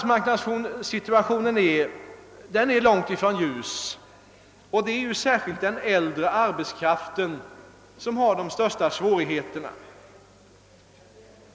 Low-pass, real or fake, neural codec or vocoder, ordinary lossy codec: none; real; none; none